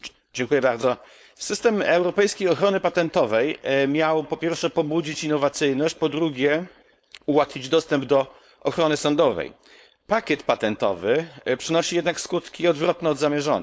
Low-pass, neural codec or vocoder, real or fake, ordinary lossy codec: none; codec, 16 kHz, 4.8 kbps, FACodec; fake; none